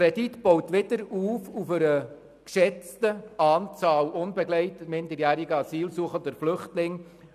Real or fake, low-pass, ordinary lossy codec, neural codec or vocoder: real; 14.4 kHz; none; none